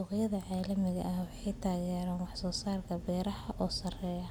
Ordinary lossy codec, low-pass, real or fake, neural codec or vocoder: none; none; real; none